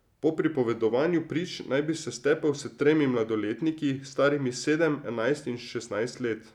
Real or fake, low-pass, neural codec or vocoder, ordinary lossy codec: real; 19.8 kHz; none; none